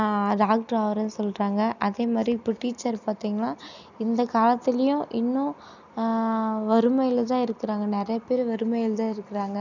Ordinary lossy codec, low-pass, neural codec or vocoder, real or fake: none; 7.2 kHz; none; real